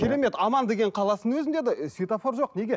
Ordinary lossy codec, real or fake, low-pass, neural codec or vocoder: none; real; none; none